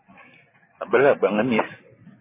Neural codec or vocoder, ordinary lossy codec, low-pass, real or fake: none; MP3, 16 kbps; 3.6 kHz; real